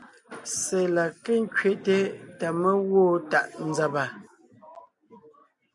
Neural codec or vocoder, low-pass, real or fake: none; 10.8 kHz; real